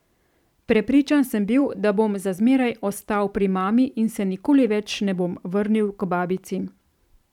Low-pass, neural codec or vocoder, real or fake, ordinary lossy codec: 19.8 kHz; vocoder, 44.1 kHz, 128 mel bands every 512 samples, BigVGAN v2; fake; none